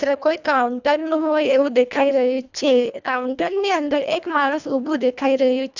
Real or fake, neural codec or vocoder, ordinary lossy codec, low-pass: fake; codec, 24 kHz, 1.5 kbps, HILCodec; none; 7.2 kHz